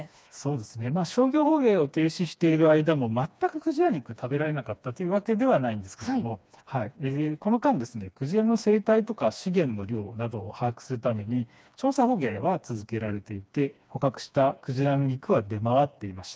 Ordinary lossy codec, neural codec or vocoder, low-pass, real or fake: none; codec, 16 kHz, 2 kbps, FreqCodec, smaller model; none; fake